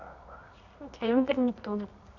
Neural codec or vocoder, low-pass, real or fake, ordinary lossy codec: codec, 24 kHz, 0.9 kbps, WavTokenizer, medium music audio release; 7.2 kHz; fake; none